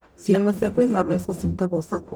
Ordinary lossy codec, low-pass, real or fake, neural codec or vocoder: none; none; fake; codec, 44.1 kHz, 0.9 kbps, DAC